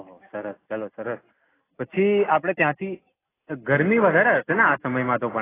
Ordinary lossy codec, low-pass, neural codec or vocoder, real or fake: AAC, 16 kbps; 3.6 kHz; none; real